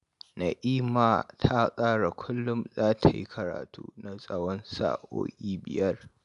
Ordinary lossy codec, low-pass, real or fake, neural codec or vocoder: none; 10.8 kHz; real; none